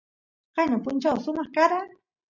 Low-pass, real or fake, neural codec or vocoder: 7.2 kHz; real; none